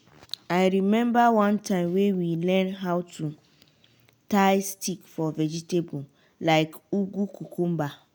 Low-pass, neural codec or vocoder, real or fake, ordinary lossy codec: none; none; real; none